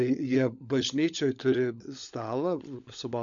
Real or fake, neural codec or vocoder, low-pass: fake; codec, 16 kHz, 16 kbps, FunCodec, trained on LibriTTS, 50 frames a second; 7.2 kHz